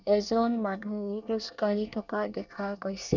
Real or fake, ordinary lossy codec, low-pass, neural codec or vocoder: fake; none; 7.2 kHz; codec, 24 kHz, 1 kbps, SNAC